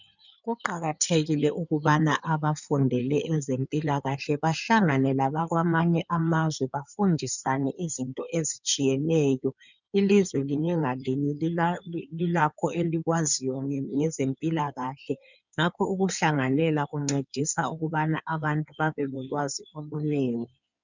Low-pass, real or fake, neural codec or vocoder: 7.2 kHz; fake; codec, 16 kHz in and 24 kHz out, 2.2 kbps, FireRedTTS-2 codec